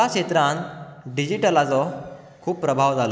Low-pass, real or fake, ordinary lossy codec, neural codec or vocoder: none; real; none; none